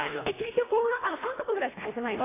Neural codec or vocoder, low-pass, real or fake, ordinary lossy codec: codec, 24 kHz, 1.5 kbps, HILCodec; 3.6 kHz; fake; AAC, 16 kbps